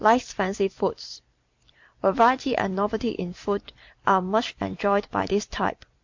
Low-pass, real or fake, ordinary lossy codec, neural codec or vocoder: 7.2 kHz; fake; MP3, 48 kbps; vocoder, 44.1 kHz, 128 mel bands every 256 samples, BigVGAN v2